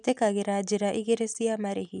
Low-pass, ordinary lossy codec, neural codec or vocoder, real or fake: 10.8 kHz; none; none; real